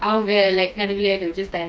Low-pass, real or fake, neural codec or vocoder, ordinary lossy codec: none; fake; codec, 16 kHz, 2 kbps, FreqCodec, smaller model; none